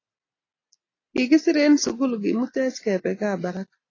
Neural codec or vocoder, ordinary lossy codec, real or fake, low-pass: none; AAC, 32 kbps; real; 7.2 kHz